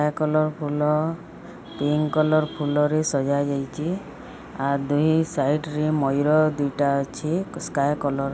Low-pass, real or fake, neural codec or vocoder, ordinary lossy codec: none; real; none; none